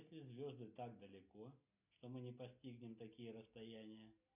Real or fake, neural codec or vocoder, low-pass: real; none; 3.6 kHz